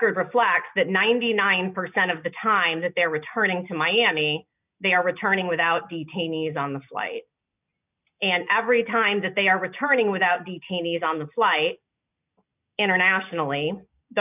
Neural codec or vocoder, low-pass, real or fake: none; 3.6 kHz; real